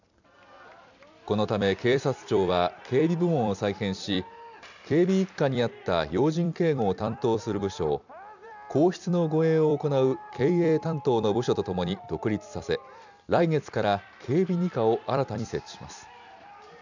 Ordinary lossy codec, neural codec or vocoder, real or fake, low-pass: none; vocoder, 44.1 kHz, 128 mel bands every 256 samples, BigVGAN v2; fake; 7.2 kHz